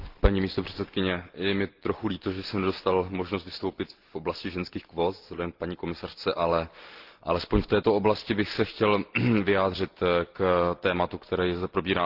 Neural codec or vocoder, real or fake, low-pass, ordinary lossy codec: none; real; 5.4 kHz; Opus, 16 kbps